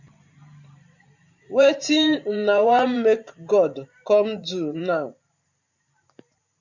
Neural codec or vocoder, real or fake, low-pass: vocoder, 44.1 kHz, 128 mel bands every 512 samples, BigVGAN v2; fake; 7.2 kHz